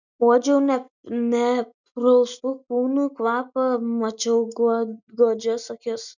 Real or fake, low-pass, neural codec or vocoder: real; 7.2 kHz; none